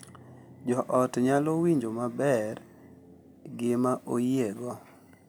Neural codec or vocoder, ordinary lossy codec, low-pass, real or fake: none; none; none; real